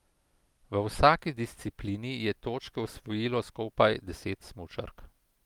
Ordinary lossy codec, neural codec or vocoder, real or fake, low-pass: Opus, 32 kbps; none; real; 19.8 kHz